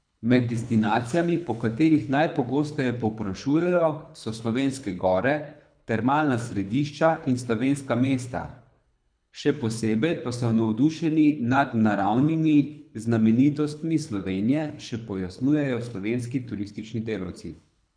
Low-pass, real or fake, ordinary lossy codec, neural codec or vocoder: 9.9 kHz; fake; MP3, 96 kbps; codec, 24 kHz, 3 kbps, HILCodec